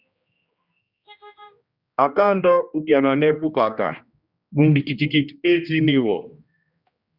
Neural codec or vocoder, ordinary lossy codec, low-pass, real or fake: codec, 16 kHz, 1 kbps, X-Codec, HuBERT features, trained on general audio; Opus, 64 kbps; 5.4 kHz; fake